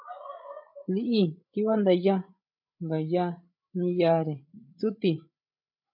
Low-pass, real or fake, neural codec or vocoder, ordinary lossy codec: 5.4 kHz; fake; codec, 16 kHz, 16 kbps, FreqCodec, larger model; MP3, 48 kbps